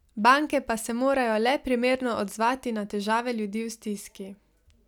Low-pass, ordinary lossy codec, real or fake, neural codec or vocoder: 19.8 kHz; none; real; none